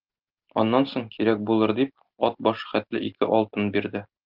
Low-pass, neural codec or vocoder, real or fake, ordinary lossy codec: 5.4 kHz; none; real; Opus, 32 kbps